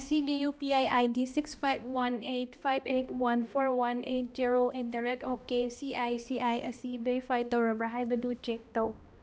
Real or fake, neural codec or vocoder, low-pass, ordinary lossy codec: fake; codec, 16 kHz, 1 kbps, X-Codec, HuBERT features, trained on balanced general audio; none; none